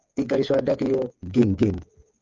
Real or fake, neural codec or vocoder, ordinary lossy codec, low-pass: fake; codec, 16 kHz, 8 kbps, FreqCodec, smaller model; Opus, 24 kbps; 7.2 kHz